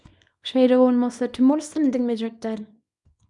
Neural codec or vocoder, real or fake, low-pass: codec, 24 kHz, 0.9 kbps, WavTokenizer, small release; fake; 10.8 kHz